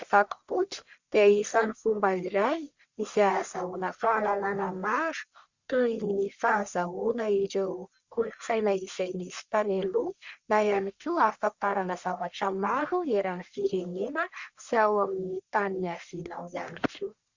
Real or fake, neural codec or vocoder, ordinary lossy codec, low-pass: fake; codec, 44.1 kHz, 1.7 kbps, Pupu-Codec; Opus, 64 kbps; 7.2 kHz